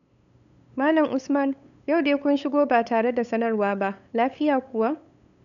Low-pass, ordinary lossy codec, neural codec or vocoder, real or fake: 7.2 kHz; none; codec, 16 kHz, 8 kbps, FunCodec, trained on LibriTTS, 25 frames a second; fake